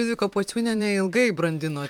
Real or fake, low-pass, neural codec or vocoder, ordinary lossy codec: fake; 19.8 kHz; vocoder, 44.1 kHz, 128 mel bands, Pupu-Vocoder; MP3, 96 kbps